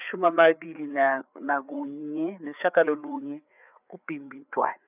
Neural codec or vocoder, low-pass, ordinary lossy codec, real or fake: codec, 16 kHz, 4 kbps, FreqCodec, larger model; 3.6 kHz; none; fake